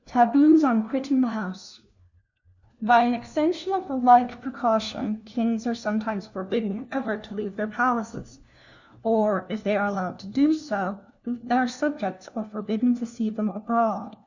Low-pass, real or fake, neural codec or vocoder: 7.2 kHz; fake; codec, 16 kHz, 1 kbps, FunCodec, trained on LibriTTS, 50 frames a second